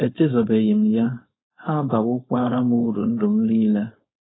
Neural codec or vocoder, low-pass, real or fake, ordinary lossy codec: codec, 16 kHz, 4.8 kbps, FACodec; 7.2 kHz; fake; AAC, 16 kbps